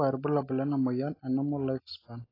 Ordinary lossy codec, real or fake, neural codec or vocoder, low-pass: AAC, 24 kbps; real; none; 5.4 kHz